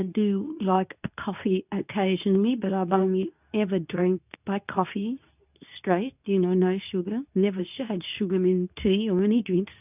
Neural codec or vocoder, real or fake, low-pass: codec, 24 kHz, 0.9 kbps, WavTokenizer, medium speech release version 2; fake; 3.6 kHz